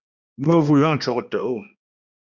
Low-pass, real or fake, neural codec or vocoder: 7.2 kHz; fake; codec, 16 kHz, 1 kbps, X-Codec, HuBERT features, trained on balanced general audio